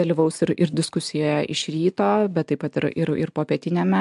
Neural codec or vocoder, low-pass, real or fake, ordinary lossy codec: none; 10.8 kHz; real; MP3, 64 kbps